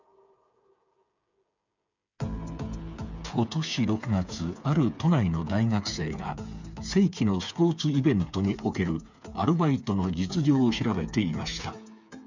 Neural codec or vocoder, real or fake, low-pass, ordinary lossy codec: codec, 16 kHz, 8 kbps, FreqCodec, smaller model; fake; 7.2 kHz; none